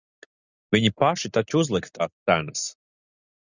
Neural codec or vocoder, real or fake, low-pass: none; real; 7.2 kHz